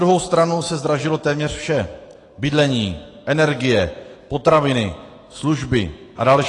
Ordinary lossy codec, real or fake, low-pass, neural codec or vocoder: AAC, 32 kbps; fake; 10.8 kHz; autoencoder, 48 kHz, 128 numbers a frame, DAC-VAE, trained on Japanese speech